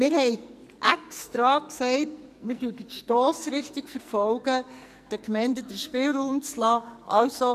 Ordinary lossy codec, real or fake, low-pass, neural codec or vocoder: none; fake; 14.4 kHz; codec, 44.1 kHz, 2.6 kbps, SNAC